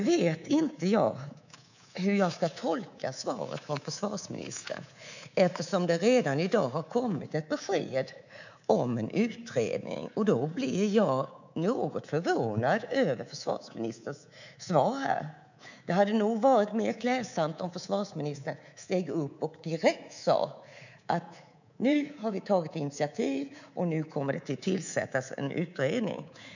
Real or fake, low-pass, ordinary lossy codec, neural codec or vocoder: fake; 7.2 kHz; none; codec, 24 kHz, 3.1 kbps, DualCodec